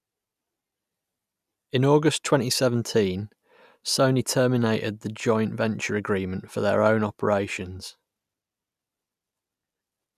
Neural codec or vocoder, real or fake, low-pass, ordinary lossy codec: none; real; 14.4 kHz; none